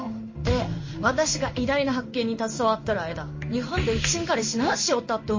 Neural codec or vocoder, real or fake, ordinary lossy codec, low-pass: codec, 16 kHz in and 24 kHz out, 1 kbps, XY-Tokenizer; fake; MP3, 32 kbps; 7.2 kHz